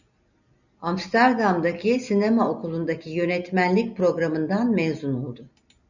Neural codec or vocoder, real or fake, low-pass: none; real; 7.2 kHz